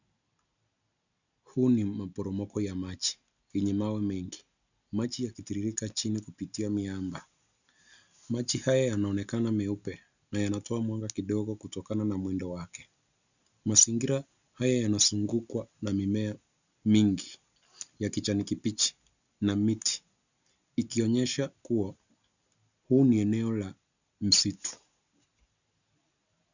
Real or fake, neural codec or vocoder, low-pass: real; none; 7.2 kHz